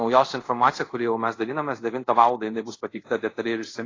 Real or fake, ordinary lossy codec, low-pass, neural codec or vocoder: fake; AAC, 32 kbps; 7.2 kHz; codec, 24 kHz, 0.5 kbps, DualCodec